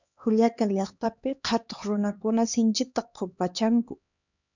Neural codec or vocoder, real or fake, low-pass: codec, 16 kHz, 2 kbps, X-Codec, HuBERT features, trained on LibriSpeech; fake; 7.2 kHz